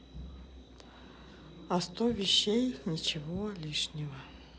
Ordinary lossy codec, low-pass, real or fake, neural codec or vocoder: none; none; real; none